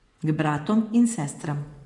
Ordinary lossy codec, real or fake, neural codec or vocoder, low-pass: MP3, 64 kbps; fake; vocoder, 24 kHz, 100 mel bands, Vocos; 10.8 kHz